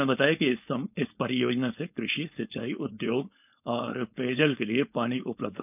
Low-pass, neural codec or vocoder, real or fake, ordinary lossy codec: 3.6 kHz; codec, 16 kHz, 4.8 kbps, FACodec; fake; none